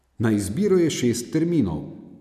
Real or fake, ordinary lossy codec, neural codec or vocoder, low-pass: real; none; none; 14.4 kHz